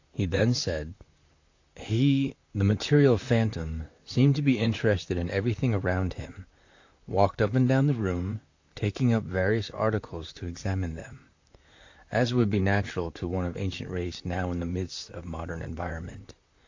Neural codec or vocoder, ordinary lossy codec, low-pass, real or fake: vocoder, 44.1 kHz, 128 mel bands, Pupu-Vocoder; AAC, 48 kbps; 7.2 kHz; fake